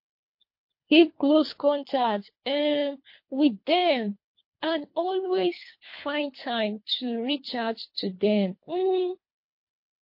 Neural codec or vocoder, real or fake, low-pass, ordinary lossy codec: codec, 24 kHz, 3 kbps, HILCodec; fake; 5.4 kHz; MP3, 32 kbps